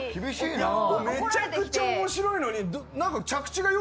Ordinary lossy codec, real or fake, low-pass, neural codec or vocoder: none; real; none; none